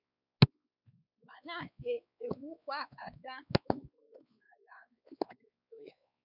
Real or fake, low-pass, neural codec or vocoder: fake; 5.4 kHz; codec, 16 kHz, 4 kbps, X-Codec, WavLM features, trained on Multilingual LibriSpeech